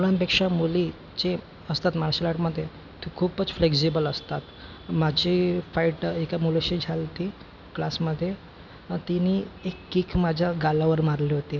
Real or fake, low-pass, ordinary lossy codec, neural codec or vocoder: real; 7.2 kHz; none; none